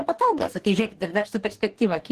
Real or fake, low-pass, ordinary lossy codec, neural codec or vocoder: fake; 14.4 kHz; Opus, 16 kbps; codec, 44.1 kHz, 2.6 kbps, DAC